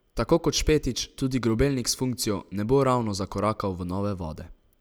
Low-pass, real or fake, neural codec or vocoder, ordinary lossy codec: none; real; none; none